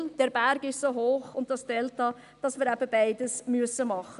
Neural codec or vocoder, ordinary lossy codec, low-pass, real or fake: none; none; 10.8 kHz; real